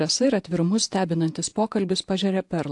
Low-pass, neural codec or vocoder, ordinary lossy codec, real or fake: 10.8 kHz; vocoder, 48 kHz, 128 mel bands, Vocos; AAC, 64 kbps; fake